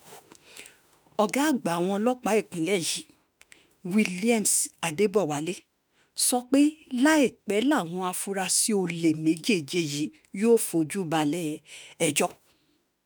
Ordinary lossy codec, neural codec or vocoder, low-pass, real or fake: none; autoencoder, 48 kHz, 32 numbers a frame, DAC-VAE, trained on Japanese speech; none; fake